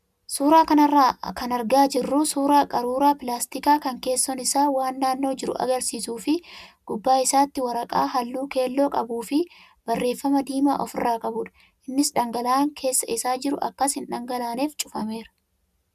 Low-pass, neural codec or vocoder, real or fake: 14.4 kHz; none; real